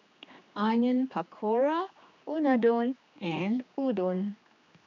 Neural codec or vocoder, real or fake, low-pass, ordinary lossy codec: codec, 16 kHz, 2 kbps, X-Codec, HuBERT features, trained on general audio; fake; 7.2 kHz; none